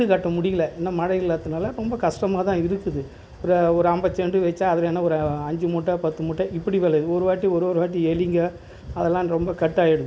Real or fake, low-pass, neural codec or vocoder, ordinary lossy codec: real; none; none; none